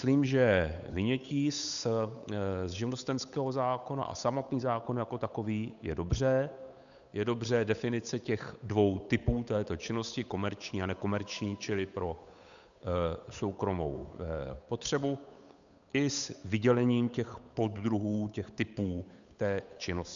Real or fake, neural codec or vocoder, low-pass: fake; codec, 16 kHz, 8 kbps, FunCodec, trained on Chinese and English, 25 frames a second; 7.2 kHz